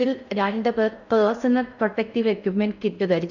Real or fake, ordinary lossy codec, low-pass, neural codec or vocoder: fake; none; 7.2 kHz; codec, 16 kHz in and 24 kHz out, 0.6 kbps, FocalCodec, streaming, 4096 codes